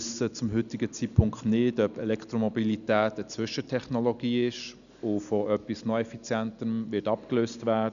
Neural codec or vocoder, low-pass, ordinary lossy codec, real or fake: none; 7.2 kHz; none; real